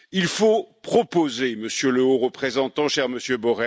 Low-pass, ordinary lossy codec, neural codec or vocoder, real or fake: none; none; none; real